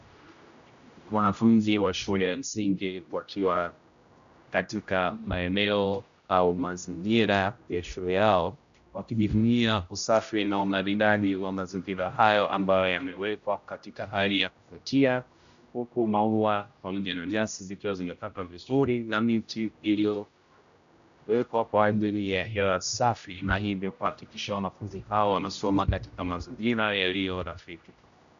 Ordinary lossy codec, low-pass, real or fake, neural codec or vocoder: AAC, 96 kbps; 7.2 kHz; fake; codec, 16 kHz, 0.5 kbps, X-Codec, HuBERT features, trained on general audio